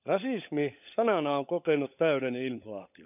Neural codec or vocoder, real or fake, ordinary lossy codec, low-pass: codec, 16 kHz, 16 kbps, FunCodec, trained on LibriTTS, 50 frames a second; fake; none; 3.6 kHz